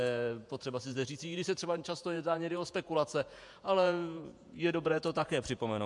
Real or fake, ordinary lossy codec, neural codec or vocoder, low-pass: fake; MP3, 64 kbps; vocoder, 48 kHz, 128 mel bands, Vocos; 10.8 kHz